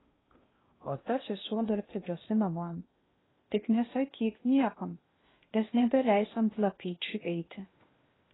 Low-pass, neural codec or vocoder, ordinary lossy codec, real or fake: 7.2 kHz; codec, 16 kHz in and 24 kHz out, 0.6 kbps, FocalCodec, streaming, 2048 codes; AAC, 16 kbps; fake